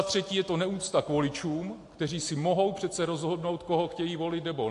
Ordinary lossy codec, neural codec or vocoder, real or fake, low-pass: AAC, 48 kbps; none; real; 10.8 kHz